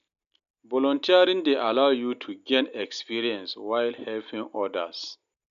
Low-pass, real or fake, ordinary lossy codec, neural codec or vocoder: 7.2 kHz; real; none; none